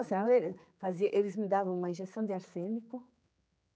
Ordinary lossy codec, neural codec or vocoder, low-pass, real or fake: none; codec, 16 kHz, 4 kbps, X-Codec, HuBERT features, trained on general audio; none; fake